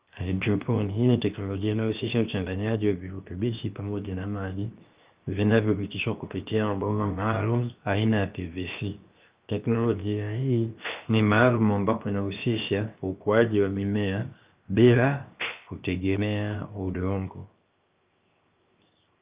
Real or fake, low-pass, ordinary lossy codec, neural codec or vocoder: fake; 3.6 kHz; Opus, 24 kbps; codec, 16 kHz, 0.7 kbps, FocalCodec